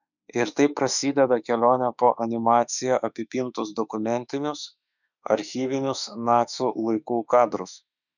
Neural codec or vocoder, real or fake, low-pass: autoencoder, 48 kHz, 32 numbers a frame, DAC-VAE, trained on Japanese speech; fake; 7.2 kHz